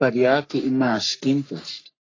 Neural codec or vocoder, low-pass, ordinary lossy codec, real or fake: codec, 44.1 kHz, 3.4 kbps, Pupu-Codec; 7.2 kHz; AAC, 32 kbps; fake